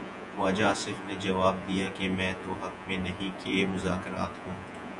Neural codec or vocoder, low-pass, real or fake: vocoder, 48 kHz, 128 mel bands, Vocos; 10.8 kHz; fake